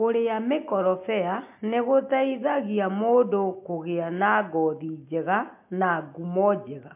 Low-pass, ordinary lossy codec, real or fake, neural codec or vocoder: 3.6 kHz; MP3, 32 kbps; real; none